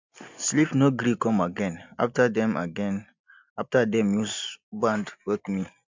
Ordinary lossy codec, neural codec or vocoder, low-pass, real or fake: MP3, 64 kbps; none; 7.2 kHz; real